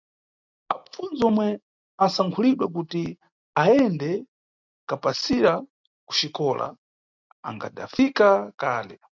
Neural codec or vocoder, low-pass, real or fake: none; 7.2 kHz; real